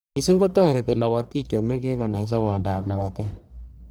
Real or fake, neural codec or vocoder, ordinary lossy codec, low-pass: fake; codec, 44.1 kHz, 1.7 kbps, Pupu-Codec; none; none